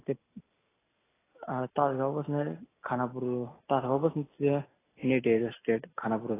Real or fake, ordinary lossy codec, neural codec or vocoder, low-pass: real; AAC, 16 kbps; none; 3.6 kHz